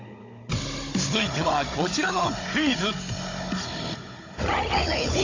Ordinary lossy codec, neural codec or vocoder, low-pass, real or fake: AAC, 32 kbps; codec, 16 kHz, 16 kbps, FunCodec, trained on Chinese and English, 50 frames a second; 7.2 kHz; fake